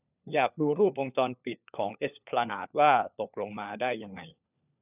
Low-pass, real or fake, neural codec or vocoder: 3.6 kHz; fake; codec, 16 kHz, 4 kbps, FunCodec, trained on LibriTTS, 50 frames a second